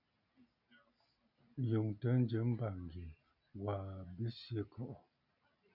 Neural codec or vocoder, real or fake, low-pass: none; real; 5.4 kHz